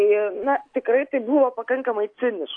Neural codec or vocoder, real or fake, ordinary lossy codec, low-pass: none; real; AAC, 48 kbps; 9.9 kHz